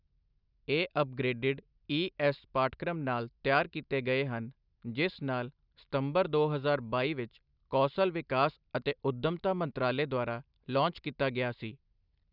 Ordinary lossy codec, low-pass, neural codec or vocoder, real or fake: none; 5.4 kHz; none; real